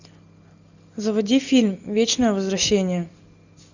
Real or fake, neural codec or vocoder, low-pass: real; none; 7.2 kHz